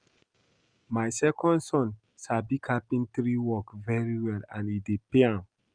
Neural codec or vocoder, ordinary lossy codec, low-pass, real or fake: none; Opus, 32 kbps; 9.9 kHz; real